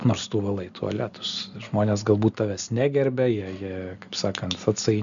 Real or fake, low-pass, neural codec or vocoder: real; 7.2 kHz; none